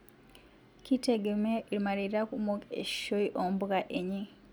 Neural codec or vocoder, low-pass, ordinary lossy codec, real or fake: none; none; none; real